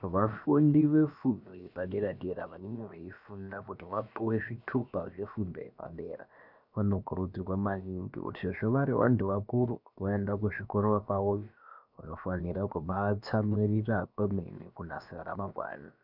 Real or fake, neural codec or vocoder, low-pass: fake; codec, 16 kHz, about 1 kbps, DyCAST, with the encoder's durations; 5.4 kHz